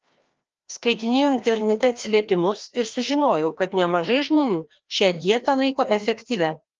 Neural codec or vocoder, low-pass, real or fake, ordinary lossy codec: codec, 16 kHz, 1 kbps, FreqCodec, larger model; 7.2 kHz; fake; Opus, 24 kbps